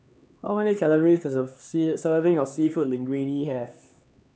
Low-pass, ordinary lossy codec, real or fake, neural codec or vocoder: none; none; fake; codec, 16 kHz, 2 kbps, X-Codec, HuBERT features, trained on LibriSpeech